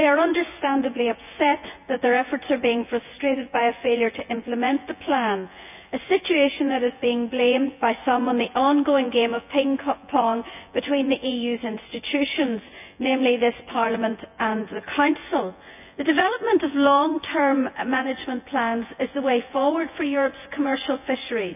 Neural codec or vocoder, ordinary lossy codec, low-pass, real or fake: vocoder, 24 kHz, 100 mel bands, Vocos; none; 3.6 kHz; fake